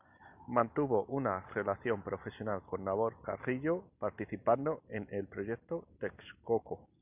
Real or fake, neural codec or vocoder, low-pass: real; none; 3.6 kHz